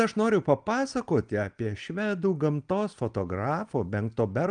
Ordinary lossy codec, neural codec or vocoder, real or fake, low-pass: Opus, 32 kbps; none; real; 9.9 kHz